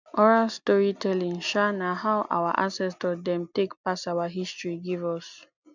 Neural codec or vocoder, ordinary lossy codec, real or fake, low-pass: none; none; real; 7.2 kHz